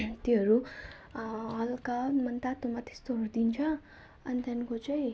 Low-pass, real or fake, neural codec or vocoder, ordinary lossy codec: none; real; none; none